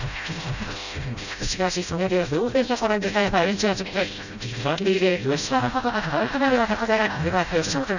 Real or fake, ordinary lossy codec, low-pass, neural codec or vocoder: fake; none; 7.2 kHz; codec, 16 kHz, 0.5 kbps, FreqCodec, smaller model